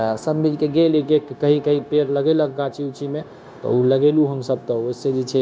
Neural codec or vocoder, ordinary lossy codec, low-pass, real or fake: codec, 16 kHz, 0.9 kbps, LongCat-Audio-Codec; none; none; fake